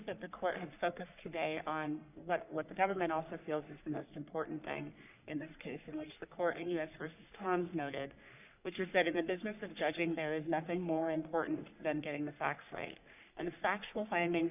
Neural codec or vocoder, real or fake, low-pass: codec, 44.1 kHz, 3.4 kbps, Pupu-Codec; fake; 3.6 kHz